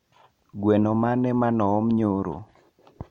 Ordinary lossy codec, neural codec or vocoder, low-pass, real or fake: MP3, 64 kbps; none; 19.8 kHz; real